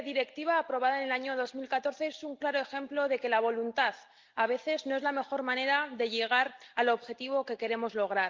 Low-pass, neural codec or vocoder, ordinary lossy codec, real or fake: 7.2 kHz; none; Opus, 32 kbps; real